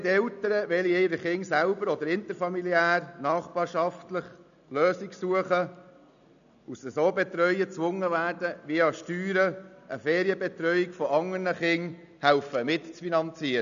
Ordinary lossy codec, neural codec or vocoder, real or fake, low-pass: none; none; real; 7.2 kHz